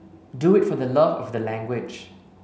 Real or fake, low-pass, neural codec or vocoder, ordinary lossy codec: real; none; none; none